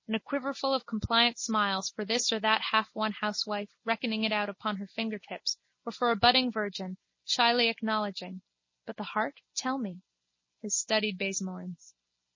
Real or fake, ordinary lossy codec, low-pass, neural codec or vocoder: real; MP3, 32 kbps; 7.2 kHz; none